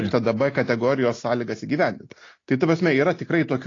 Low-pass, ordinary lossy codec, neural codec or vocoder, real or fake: 7.2 kHz; AAC, 32 kbps; none; real